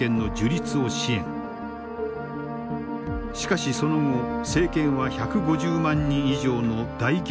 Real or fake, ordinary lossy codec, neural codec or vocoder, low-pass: real; none; none; none